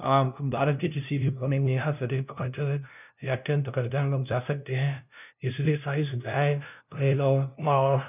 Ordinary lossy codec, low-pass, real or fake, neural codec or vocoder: none; 3.6 kHz; fake; codec, 16 kHz, 0.5 kbps, FunCodec, trained on LibriTTS, 25 frames a second